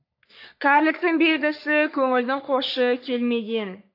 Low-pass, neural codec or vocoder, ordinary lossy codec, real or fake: 5.4 kHz; codec, 44.1 kHz, 3.4 kbps, Pupu-Codec; AAC, 32 kbps; fake